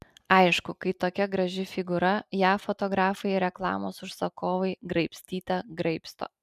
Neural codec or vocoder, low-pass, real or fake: none; 14.4 kHz; real